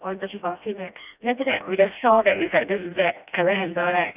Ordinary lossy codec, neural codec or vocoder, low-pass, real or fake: none; codec, 16 kHz, 1 kbps, FreqCodec, smaller model; 3.6 kHz; fake